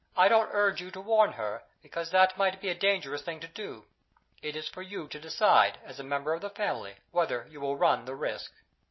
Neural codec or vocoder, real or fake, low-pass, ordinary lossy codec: none; real; 7.2 kHz; MP3, 24 kbps